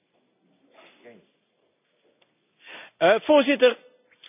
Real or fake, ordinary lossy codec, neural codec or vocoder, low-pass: real; none; none; 3.6 kHz